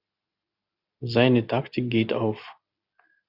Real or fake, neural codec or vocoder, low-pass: real; none; 5.4 kHz